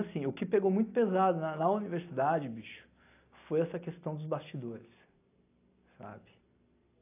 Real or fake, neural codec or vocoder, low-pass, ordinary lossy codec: real; none; 3.6 kHz; AAC, 24 kbps